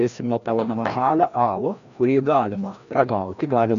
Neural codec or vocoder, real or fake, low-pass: codec, 16 kHz, 1 kbps, FreqCodec, larger model; fake; 7.2 kHz